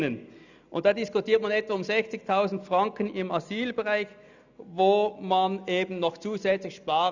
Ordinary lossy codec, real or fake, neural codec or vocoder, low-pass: none; real; none; 7.2 kHz